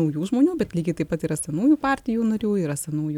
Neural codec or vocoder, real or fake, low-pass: none; real; 19.8 kHz